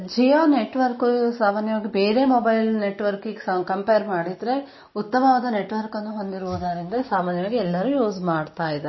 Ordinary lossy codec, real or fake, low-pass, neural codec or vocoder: MP3, 24 kbps; real; 7.2 kHz; none